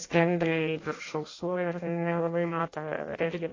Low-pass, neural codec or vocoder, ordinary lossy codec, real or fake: 7.2 kHz; codec, 16 kHz in and 24 kHz out, 0.6 kbps, FireRedTTS-2 codec; AAC, 32 kbps; fake